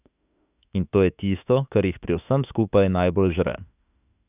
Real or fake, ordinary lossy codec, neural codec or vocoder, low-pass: fake; none; autoencoder, 48 kHz, 32 numbers a frame, DAC-VAE, trained on Japanese speech; 3.6 kHz